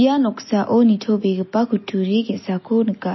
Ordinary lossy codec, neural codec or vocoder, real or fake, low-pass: MP3, 24 kbps; none; real; 7.2 kHz